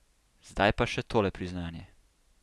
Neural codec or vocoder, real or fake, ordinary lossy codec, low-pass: none; real; none; none